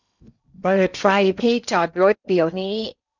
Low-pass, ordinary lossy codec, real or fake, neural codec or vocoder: 7.2 kHz; none; fake; codec, 16 kHz in and 24 kHz out, 0.8 kbps, FocalCodec, streaming, 65536 codes